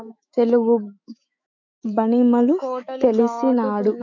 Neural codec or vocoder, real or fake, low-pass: autoencoder, 48 kHz, 128 numbers a frame, DAC-VAE, trained on Japanese speech; fake; 7.2 kHz